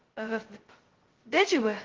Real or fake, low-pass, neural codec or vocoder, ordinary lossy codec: fake; 7.2 kHz; codec, 16 kHz, 0.2 kbps, FocalCodec; Opus, 16 kbps